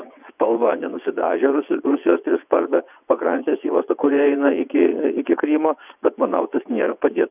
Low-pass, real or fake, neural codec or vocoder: 3.6 kHz; fake; vocoder, 22.05 kHz, 80 mel bands, WaveNeXt